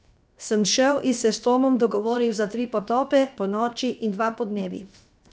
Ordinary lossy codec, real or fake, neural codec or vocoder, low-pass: none; fake; codec, 16 kHz, 0.7 kbps, FocalCodec; none